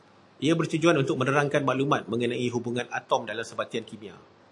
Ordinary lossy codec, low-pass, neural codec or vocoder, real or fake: AAC, 64 kbps; 10.8 kHz; none; real